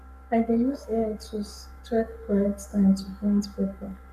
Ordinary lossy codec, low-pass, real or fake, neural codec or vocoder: none; 14.4 kHz; fake; codec, 44.1 kHz, 7.8 kbps, Pupu-Codec